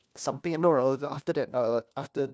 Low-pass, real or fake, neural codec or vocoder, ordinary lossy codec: none; fake; codec, 16 kHz, 1 kbps, FunCodec, trained on LibriTTS, 50 frames a second; none